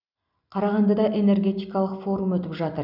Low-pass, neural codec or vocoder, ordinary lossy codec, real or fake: 5.4 kHz; none; none; real